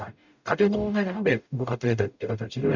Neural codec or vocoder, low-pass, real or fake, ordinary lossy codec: codec, 44.1 kHz, 0.9 kbps, DAC; 7.2 kHz; fake; none